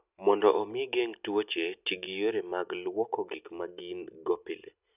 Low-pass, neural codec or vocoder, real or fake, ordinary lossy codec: 3.6 kHz; none; real; none